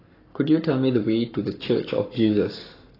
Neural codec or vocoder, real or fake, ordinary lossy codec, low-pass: codec, 44.1 kHz, 7.8 kbps, Pupu-Codec; fake; AAC, 24 kbps; 5.4 kHz